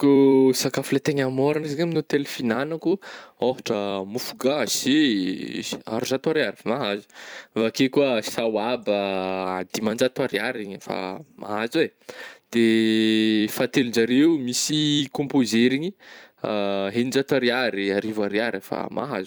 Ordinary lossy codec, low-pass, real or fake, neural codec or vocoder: none; none; fake; vocoder, 44.1 kHz, 128 mel bands every 256 samples, BigVGAN v2